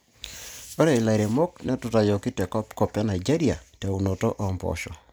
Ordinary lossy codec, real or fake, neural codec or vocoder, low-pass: none; real; none; none